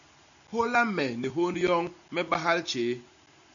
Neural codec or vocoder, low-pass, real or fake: none; 7.2 kHz; real